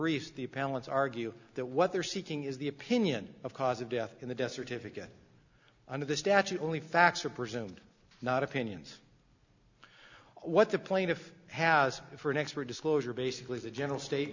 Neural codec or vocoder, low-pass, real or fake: none; 7.2 kHz; real